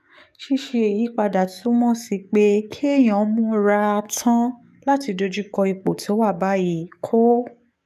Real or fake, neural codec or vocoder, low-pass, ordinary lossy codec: fake; codec, 44.1 kHz, 7.8 kbps, DAC; 14.4 kHz; none